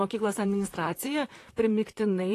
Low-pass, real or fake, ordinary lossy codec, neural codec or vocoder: 14.4 kHz; fake; AAC, 48 kbps; vocoder, 44.1 kHz, 128 mel bands, Pupu-Vocoder